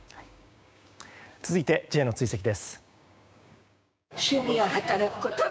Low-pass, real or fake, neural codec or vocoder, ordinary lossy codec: none; fake; codec, 16 kHz, 6 kbps, DAC; none